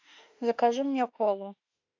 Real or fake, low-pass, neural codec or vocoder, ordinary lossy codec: fake; 7.2 kHz; autoencoder, 48 kHz, 32 numbers a frame, DAC-VAE, trained on Japanese speech; AAC, 48 kbps